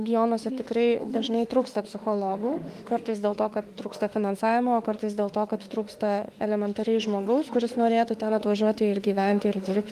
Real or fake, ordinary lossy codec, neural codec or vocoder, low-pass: fake; Opus, 32 kbps; autoencoder, 48 kHz, 32 numbers a frame, DAC-VAE, trained on Japanese speech; 14.4 kHz